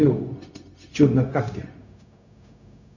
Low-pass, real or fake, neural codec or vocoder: 7.2 kHz; fake; codec, 16 kHz, 0.4 kbps, LongCat-Audio-Codec